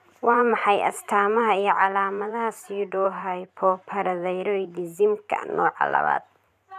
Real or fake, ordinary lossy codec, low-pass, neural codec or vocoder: fake; none; 14.4 kHz; vocoder, 44.1 kHz, 128 mel bands every 512 samples, BigVGAN v2